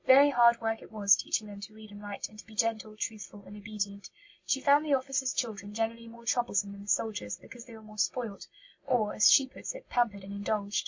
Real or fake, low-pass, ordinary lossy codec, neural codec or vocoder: real; 7.2 kHz; MP3, 48 kbps; none